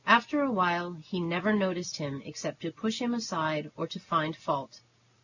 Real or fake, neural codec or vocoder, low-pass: real; none; 7.2 kHz